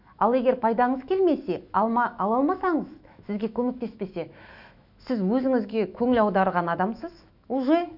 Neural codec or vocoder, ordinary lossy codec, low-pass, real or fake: autoencoder, 48 kHz, 128 numbers a frame, DAC-VAE, trained on Japanese speech; none; 5.4 kHz; fake